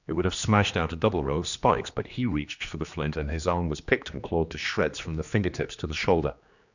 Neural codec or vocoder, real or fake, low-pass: codec, 16 kHz, 2 kbps, X-Codec, HuBERT features, trained on general audio; fake; 7.2 kHz